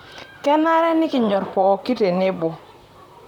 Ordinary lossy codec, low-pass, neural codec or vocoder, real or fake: none; 19.8 kHz; vocoder, 44.1 kHz, 128 mel bands, Pupu-Vocoder; fake